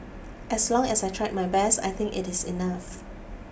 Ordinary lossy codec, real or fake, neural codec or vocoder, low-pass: none; real; none; none